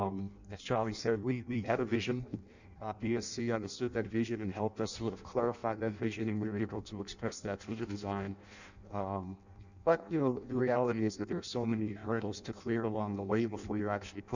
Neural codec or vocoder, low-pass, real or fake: codec, 16 kHz in and 24 kHz out, 0.6 kbps, FireRedTTS-2 codec; 7.2 kHz; fake